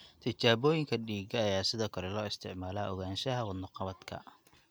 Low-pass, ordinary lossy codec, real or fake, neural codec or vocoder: none; none; real; none